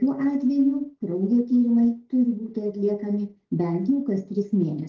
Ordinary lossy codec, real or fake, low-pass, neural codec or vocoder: Opus, 16 kbps; real; 7.2 kHz; none